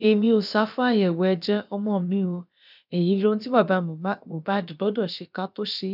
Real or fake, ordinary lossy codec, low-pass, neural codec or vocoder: fake; none; 5.4 kHz; codec, 16 kHz, about 1 kbps, DyCAST, with the encoder's durations